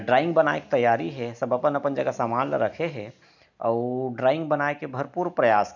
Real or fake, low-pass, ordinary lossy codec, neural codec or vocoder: real; 7.2 kHz; none; none